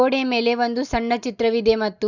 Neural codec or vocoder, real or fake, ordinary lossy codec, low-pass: none; real; none; 7.2 kHz